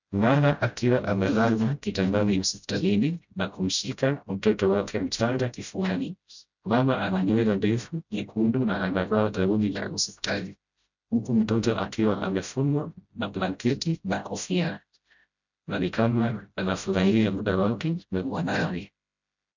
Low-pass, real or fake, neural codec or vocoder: 7.2 kHz; fake; codec, 16 kHz, 0.5 kbps, FreqCodec, smaller model